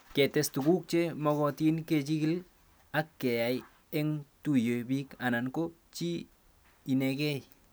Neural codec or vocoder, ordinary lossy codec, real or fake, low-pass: none; none; real; none